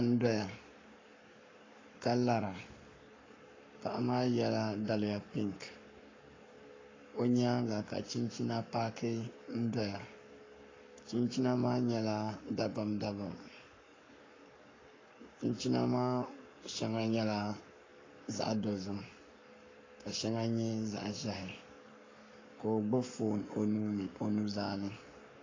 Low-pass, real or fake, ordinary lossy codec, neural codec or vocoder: 7.2 kHz; fake; AAC, 32 kbps; codec, 44.1 kHz, 7.8 kbps, Pupu-Codec